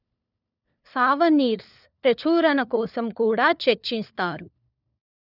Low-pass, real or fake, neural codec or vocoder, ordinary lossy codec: 5.4 kHz; fake; codec, 16 kHz, 4 kbps, FunCodec, trained on LibriTTS, 50 frames a second; none